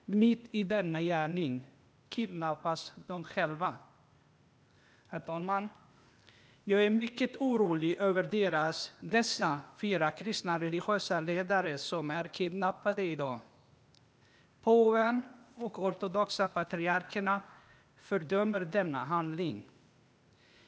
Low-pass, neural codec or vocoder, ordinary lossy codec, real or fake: none; codec, 16 kHz, 0.8 kbps, ZipCodec; none; fake